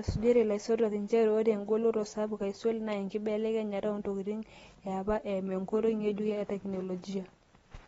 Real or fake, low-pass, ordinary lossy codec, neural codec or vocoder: real; 19.8 kHz; AAC, 24 kbps; none